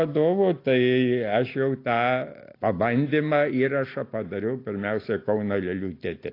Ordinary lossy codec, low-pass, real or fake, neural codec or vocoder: AAC, 32 kbps; 5.4 kHz; real; none